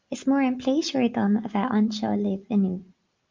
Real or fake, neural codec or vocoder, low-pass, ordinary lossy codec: real; none; 7.2 kHz; Opus, 32 kbps